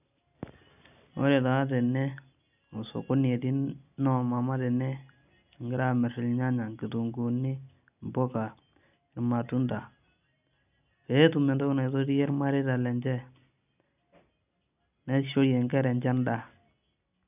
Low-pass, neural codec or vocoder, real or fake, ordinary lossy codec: 3.6 kHz; none; real; none